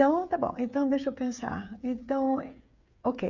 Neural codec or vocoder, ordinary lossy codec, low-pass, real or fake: codec, 16 kHz, 8 kbps, FreqCodec, smaller model; none; 7.2 kHz; fake